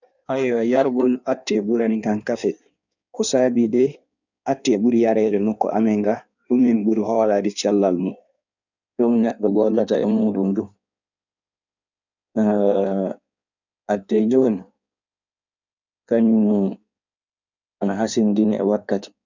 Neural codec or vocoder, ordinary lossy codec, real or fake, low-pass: codec, 16 kHz in and 24 kHz out, 1.1 kbps, FireRedTTS-2 codec; none; fake; 7.2 kHz